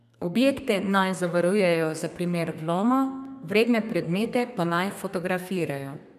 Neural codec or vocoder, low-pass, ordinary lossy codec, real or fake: codec, 32 kHz, 1.9 kbps, SNAC; 14.4 kHz; none; fake